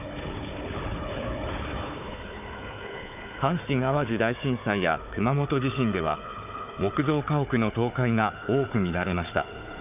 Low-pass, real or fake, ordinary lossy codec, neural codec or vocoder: 3.6 kHz; fake; none; codec, 16 kHz, 4 kbps, FunCodec, trained on Chinese and English, 50 frames a second